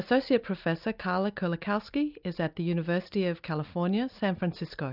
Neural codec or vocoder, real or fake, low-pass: none; real; 5.4 kHz